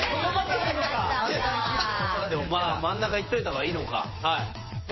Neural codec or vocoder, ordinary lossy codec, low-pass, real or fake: vocoder, 22.05 kHz, 80 mel bands, WaveNeXt; MP3, 24 kbps; 7.2 kHz; fake